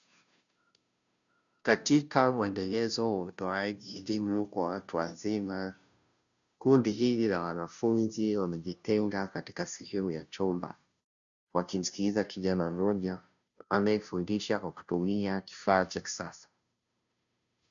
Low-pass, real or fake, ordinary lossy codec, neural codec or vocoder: 7.2 kHz; fake; MP3, 96 kbps; codec, 16 kHz, 0.5 kbps, FunCodec, trained on Chinese and English, 25 frames a second